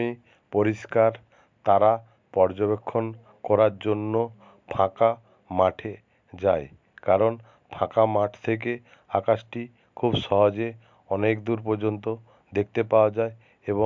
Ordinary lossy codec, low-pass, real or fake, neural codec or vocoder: MP3, 64 kbps; 7.2 kHz; real; none